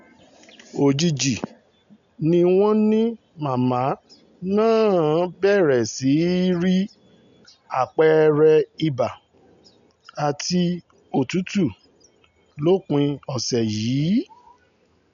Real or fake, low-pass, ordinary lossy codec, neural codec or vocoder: real; 7.2 kHz; none; none